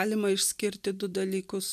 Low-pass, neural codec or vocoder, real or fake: 14.4 kHz; none; real